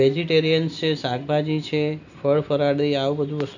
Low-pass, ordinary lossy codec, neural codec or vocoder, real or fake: 7.2 kHz; none; none; real